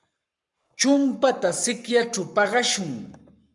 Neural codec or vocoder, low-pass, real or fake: codec, 44.1 kHz, 7.8 kbps, Pupu-Codec; 10.8 kHz; fake